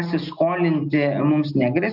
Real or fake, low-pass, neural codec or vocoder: real; 5.4 kHz; none